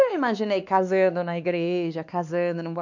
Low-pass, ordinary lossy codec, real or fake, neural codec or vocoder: 7.2 kHz; none; fake; codec, 16 kHz, 2 kbps, X-Codec, WavLM features, trained on Multilingual LibriSpeech